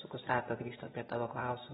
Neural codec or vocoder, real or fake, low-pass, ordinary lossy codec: vocoder, 24 kHz, 100 mel bands, Vocos; fake; 10.8 kHz; AAC, 16 kbps